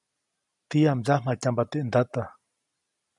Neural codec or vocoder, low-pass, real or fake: none; 10.8 kHz; real